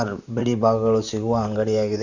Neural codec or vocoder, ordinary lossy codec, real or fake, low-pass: vocoder, 44.1 kHz, 128 mel bands, Pupu-Vocoder; none; fake; 7.2 kHz